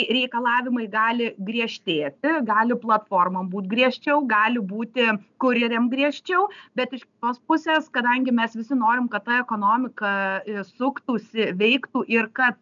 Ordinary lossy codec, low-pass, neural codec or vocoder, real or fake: MP3, 96 kbps; 7.2 kHz; none; real